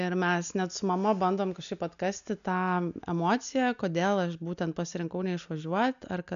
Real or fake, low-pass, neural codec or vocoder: real; 7.2 kHz; none